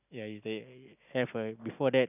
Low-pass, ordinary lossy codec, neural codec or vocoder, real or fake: 3.6 kHz; none; none; real